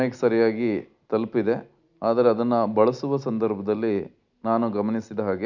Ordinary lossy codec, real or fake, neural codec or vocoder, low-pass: none; real; none; 7.2 kHz